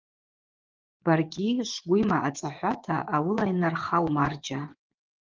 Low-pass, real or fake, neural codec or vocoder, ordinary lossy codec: 7.2 kHz; fake; vocoder, 24 kHz, 100 mel bands, Vocos; Opus, 32 kbps